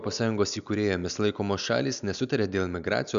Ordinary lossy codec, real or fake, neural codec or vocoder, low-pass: MP3, 96 kbps; real; none; 7.2 kHz